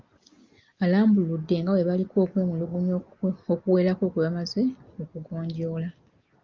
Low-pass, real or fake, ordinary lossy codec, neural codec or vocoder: 7.2 kHz; real; Opus, 24 kbps; none